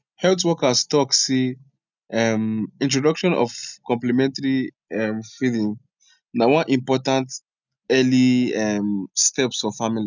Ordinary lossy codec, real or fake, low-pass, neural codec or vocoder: none; real; 7.2 kHz; none